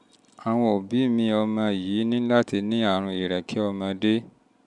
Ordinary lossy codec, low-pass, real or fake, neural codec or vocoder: MP3, 96 kbps; 10.8 kHz; real; none